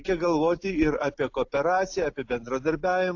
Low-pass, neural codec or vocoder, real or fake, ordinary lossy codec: 7.2 kHz; none; real; AAC, 48 kbps